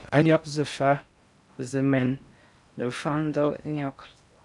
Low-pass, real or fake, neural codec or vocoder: 10.8 kHz; fake; codec, 16 kHz in and 24 kHz out, 0.6 kbps, FocalCodec, streaming, 4096 codes